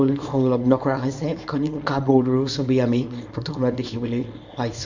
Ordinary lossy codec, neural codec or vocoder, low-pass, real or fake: Opus, 64 kbps; codec, 24 kHz, 0.9 kbps, WavTokenizer, small release; 7.2 kHz; fake